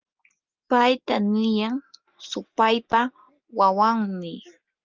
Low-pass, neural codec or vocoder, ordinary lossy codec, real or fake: 7.2 kHz; none; Opus, 24 kbps; real